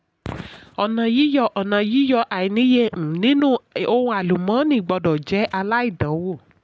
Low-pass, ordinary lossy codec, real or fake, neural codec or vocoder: none; none; real; none